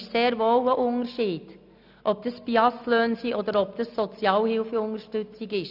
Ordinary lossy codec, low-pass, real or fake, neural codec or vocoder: none; 5.4 kHz; real; none